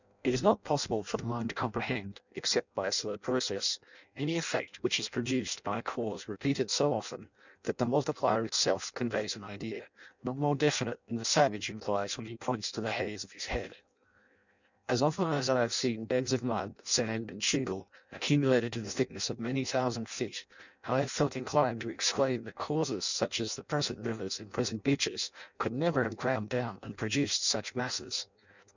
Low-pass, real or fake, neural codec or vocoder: 7.2 kHz; fake; codec, 16 kHz in and 24 kHz out, 0.6 kbps, FireRedTTS-2 codec